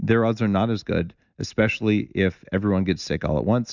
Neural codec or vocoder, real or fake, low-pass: none; real; 7.2 kHz